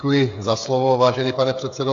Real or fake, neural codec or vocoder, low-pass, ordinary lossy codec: fake; codec, 16 kHz, 8 kbps, FreqCodec, smaller model; 7.2 kHz; AAC, 64 kbps